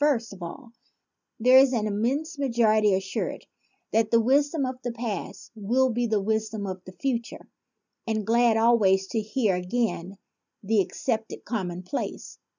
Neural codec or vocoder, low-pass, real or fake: none; 7.2 kHz; real